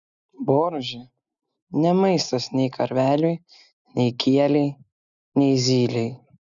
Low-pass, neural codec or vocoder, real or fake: 7.2 kHz; none; real